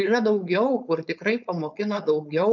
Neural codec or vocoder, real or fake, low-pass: codec, 16 kHz, 4.8 kbps, FACodec; fake; 7.2 kHz